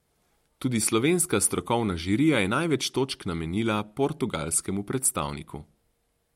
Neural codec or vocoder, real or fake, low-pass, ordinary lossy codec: none; real; 19.8 kHz; MP3, 64 kbps